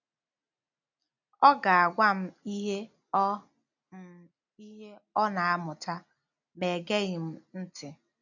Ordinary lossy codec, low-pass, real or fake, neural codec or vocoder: none; 7.2 kHz; real; none